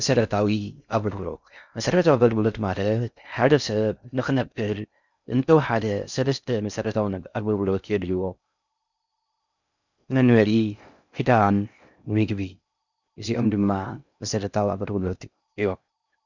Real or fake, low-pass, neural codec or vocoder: fake; 7.2 kHz; codec, 16 kHz in and 24 kHz out, 0.6 kbps, FocalCodec, streaming, 4096 codes